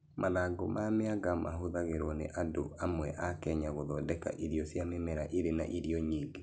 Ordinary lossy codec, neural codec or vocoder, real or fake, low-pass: none; none; real; none